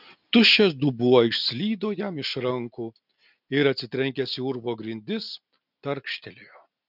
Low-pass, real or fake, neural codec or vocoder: 5.4 kHz; real; none